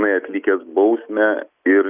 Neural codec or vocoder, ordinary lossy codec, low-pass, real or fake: none; Opus, 64 kbps; 3.6 kHz; real